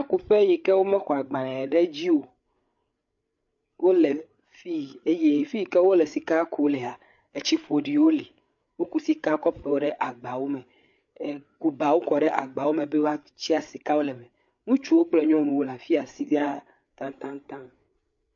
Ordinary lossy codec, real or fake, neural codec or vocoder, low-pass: MP3, 48 kbps; fake; codec, 16 kHz, 8 kbps, FreqCodec, larger model; 7.2 kHz